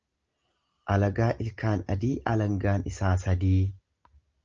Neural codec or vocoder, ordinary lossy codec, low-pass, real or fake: none; Opus, 24 kbps; 7.2 kHz; real